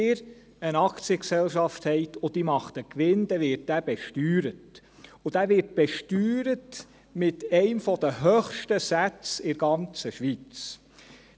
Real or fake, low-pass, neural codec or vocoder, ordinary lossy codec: real; none; none; none